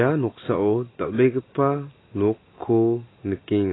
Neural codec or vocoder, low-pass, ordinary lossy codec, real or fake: none; 7.2 kHz; AAC, 16 kbps; real